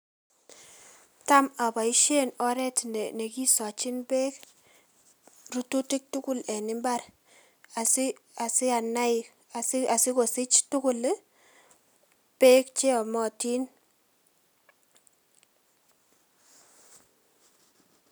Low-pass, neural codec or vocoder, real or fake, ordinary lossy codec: none; none; real; none